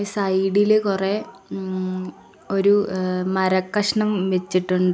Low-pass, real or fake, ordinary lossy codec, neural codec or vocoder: none; real; none; none